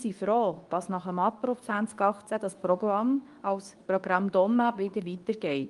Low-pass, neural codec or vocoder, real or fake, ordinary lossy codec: 10.8 kHz; codec, 24 kHz, 0.9 kbps, WavTokenizer, medium speech release version 2; fake; Opus, 32 kbps